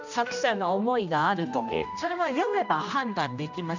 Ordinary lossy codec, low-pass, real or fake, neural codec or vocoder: none; 7.2 kHz; fake; codec, 16 kHz, 1 kbps, X-Codec, HuBERT features, trained on general audio